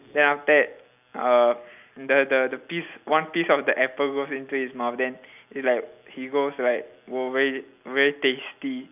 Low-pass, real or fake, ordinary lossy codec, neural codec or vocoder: 3.6 kHz; real; none; none